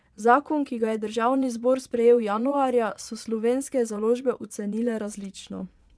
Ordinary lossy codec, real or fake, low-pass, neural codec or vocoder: none; fake; none; vocoder, 22.05 kHz, 80 mel bands, Vocos